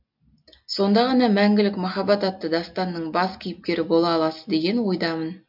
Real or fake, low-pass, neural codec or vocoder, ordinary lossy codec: real; 5.4 kHz; none; MP3, 48 kbps